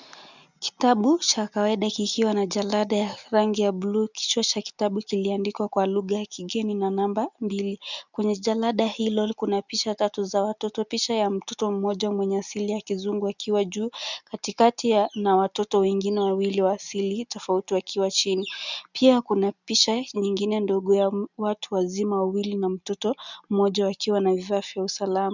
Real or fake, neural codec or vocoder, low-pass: real; none; 7.2 kHz